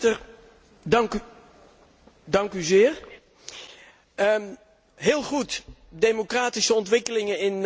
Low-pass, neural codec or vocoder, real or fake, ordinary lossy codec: none; none; real; none